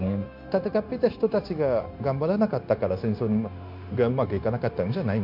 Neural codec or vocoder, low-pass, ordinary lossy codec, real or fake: codec, 16 kHz, 0.9 kbps, LongCat-Audio-Codec; 5.4 kHz; MP3, 48 kbps; fake